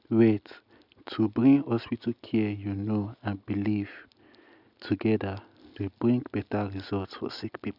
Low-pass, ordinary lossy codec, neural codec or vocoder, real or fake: 5.4 kHz; none; none; real